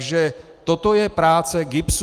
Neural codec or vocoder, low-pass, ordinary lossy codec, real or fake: none; 14.4 kHz; Opus, 32 kbps; real